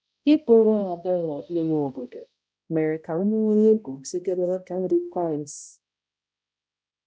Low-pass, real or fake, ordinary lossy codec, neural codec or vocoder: none; fake; none; codec, 16 kHz, 0.5 kbps, X-Codec, HuBERT features, trained on balanced general audio